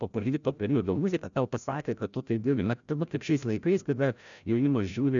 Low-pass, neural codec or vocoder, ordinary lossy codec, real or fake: 7.2 kHz; codec, 16 kHz, 0.5 kbps, FreqCodec, larger model; MP3, 96 kbps; fake